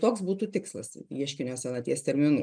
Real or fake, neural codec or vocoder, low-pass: real; none; 9.9 kHz